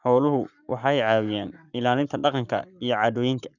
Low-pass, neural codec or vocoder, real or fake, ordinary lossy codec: 7.2 kHz; codec, 44.1 kHz, 7.8 kbps, Pupu-Codec; fake; none